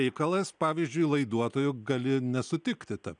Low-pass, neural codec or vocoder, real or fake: 9.9 kHz; none; real